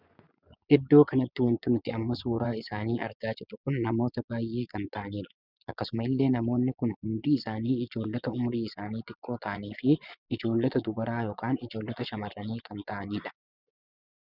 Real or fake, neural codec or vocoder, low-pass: fake; vocoder, 24 kHz, 100 mel bands, Vocos; 5.4 kHz